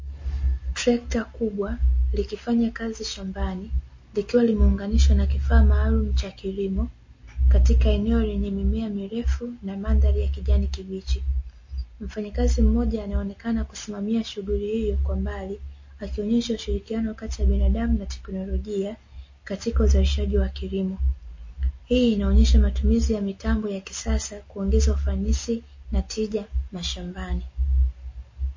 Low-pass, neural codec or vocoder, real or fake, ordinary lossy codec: 7.2 kHz; none; real; MP3, 32 kbps